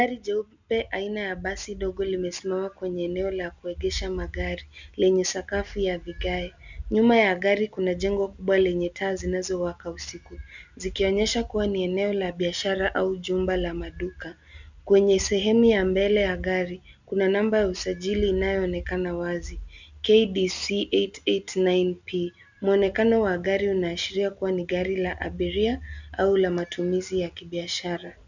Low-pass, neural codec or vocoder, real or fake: 7.2 kHz; none; real